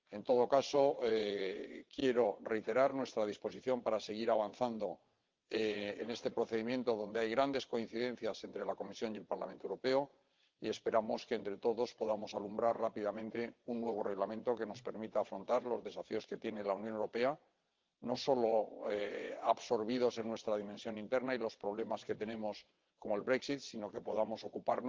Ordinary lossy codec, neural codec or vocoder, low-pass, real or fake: Opus, 24 kbps; vocoder, 22.05 kHz, 80 mel bands, WaveNeXt; 7.2 kHz; fake